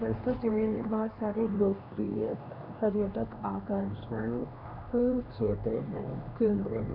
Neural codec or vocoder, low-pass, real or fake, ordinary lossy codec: codec, 16 kHz, 2 kbps, X-Codec, HuBERT features, trained on LibriSpeech; 5.4 kHz; fake; none